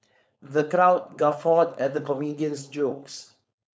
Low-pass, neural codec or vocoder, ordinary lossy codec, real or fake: none; codec, 16 kHz, 4.8 kbps, FACodec; none; fake